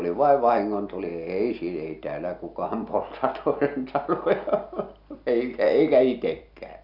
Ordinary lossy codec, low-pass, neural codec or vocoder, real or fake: none; 5.4 kHz; none; real